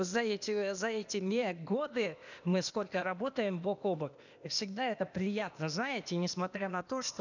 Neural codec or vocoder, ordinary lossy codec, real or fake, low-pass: codec, 16 kHz, 0.8 kbps, ZipCodec; none; fake; 7.2 kHz